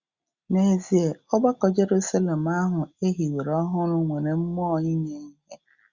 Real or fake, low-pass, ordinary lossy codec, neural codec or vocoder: real; 7.2 kHz; Opus, 64 kbps; none